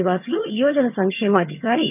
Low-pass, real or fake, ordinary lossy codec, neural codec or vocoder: 3.6 kHz; fake; none; vocoder, 22.05 kHz, 80 mel bands, HiFi-GAN